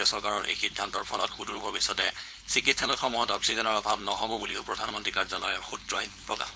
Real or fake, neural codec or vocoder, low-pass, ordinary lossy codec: fake; codec, 16 kHz, 4.8 kbps, FACodec; none; none